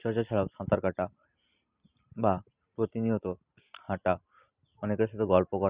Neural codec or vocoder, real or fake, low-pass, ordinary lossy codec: none; real; 3.6 kHz; Opus, 64 kbps